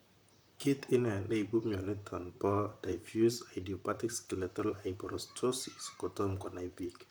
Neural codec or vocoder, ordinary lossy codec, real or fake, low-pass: vocoder, 44.1 kHz, 128 mel bands, Pupu-Vocoder; none; fake; none